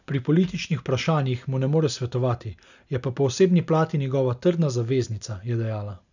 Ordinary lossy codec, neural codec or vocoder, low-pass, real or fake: none; none; 7.2 kHz; real